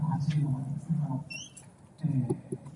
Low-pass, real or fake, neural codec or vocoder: 10.8 kHz; real; none